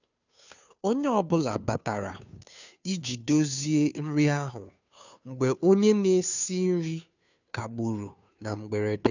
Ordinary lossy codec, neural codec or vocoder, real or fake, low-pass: none; codec, 16 kHz, 2 kbps, FunCodec, trained on Chinese and English, 25 frames a second; fake; 7.2 kHz